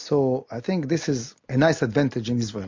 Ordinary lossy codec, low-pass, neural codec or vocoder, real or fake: MP3, 48 kbps; 7.2 kHz; none; real